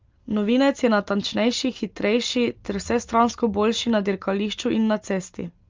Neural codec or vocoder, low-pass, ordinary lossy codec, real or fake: none; 7.2 kHz; Opus, 32 kbps; real